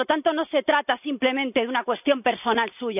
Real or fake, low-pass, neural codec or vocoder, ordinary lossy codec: real; 3.6 kHz; none; none